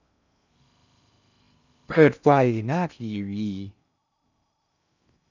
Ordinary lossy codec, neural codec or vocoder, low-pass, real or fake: none; codec, 16 kHz in and 24 kHz out, 0.6 kbps, FocalCodec, streaming, 2048 codes; 7.2 kHz; fake